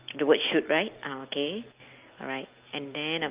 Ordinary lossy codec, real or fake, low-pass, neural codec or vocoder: Opus, 24 kbps; real; 3.6 kHz; none